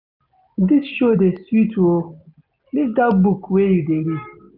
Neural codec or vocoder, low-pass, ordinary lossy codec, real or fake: none; 5.4 kHz; none; real